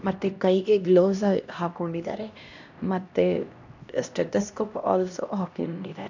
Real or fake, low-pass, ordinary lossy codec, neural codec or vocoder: fake; 7.2 kHz; none; codec, 16 kHz, 1 kbps, X-Codec, HuBERT features, trained on LibriSpeech